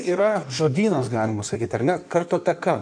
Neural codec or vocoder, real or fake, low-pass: codec, 16 kHz in and 24 kHz out, 1.1 kbps, FireRedTTS-2 codec; fake; 9.9 kHz